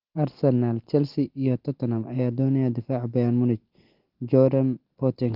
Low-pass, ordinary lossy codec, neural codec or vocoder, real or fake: 5.4 kHz; Opus, 16 kbps; none; real